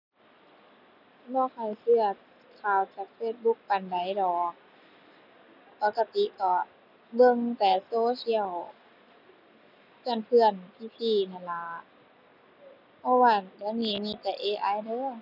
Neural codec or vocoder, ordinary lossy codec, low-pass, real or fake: none; none; 5.4 kHz; real